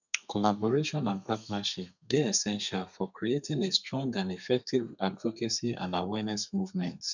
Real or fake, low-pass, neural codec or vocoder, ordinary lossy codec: fake; 7.2 kHz; codec, 32 kHz, 1.9 kbps, SNAC; none